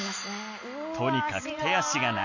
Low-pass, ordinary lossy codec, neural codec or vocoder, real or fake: 7.2 kHz; none; none; real